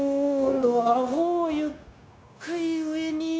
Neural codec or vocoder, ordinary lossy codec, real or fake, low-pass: codec, 16 kHz, 0.9 kbps, LongCat-Audio-Codec; none; fake; none